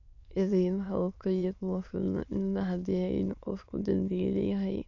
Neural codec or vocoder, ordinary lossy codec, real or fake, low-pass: autoencoder, 22.05 kHz, a latent of 192 numbers a frame, VITS, trained on many speakers; none; fake; 7.2 kHz